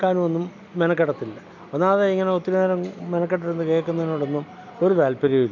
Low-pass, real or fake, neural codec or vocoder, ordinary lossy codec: 7.2 kHz; real; none; none